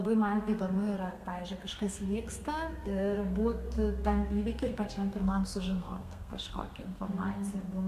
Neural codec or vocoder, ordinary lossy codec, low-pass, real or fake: codec, 32 kHz, 1.9 kbps, SNAC; AAC, 96 kbps; 14.4 kHz; fake